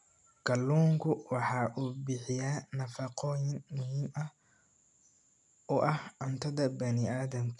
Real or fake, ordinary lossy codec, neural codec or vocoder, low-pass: real; none; none; 10.8 kHz